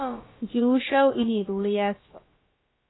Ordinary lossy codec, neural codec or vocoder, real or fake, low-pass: AAC, 16 kbps; codec, 16 kHz, about 1 kbps, DyCAST, with the encoder's durations; fake; 7.2 kHz